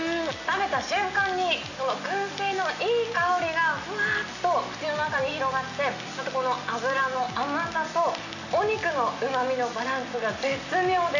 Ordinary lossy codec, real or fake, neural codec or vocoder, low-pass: none; real; none; 7.2 kHz